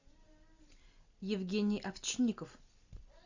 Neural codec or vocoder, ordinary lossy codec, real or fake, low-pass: none; Opus, 64 kbps; real; 7.2 kHz